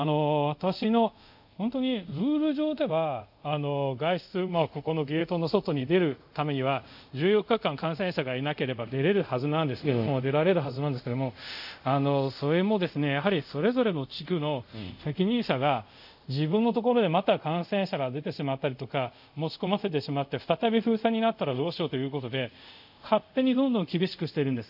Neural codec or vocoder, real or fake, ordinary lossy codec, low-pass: codec, 24 kHz, 0.5 kbps, DualCodec; fake; AAC, 48 kbps; 5.4 kHz